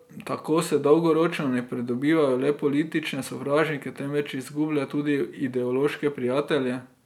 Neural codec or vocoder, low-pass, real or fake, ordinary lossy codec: none; 19.8 kHz; real; none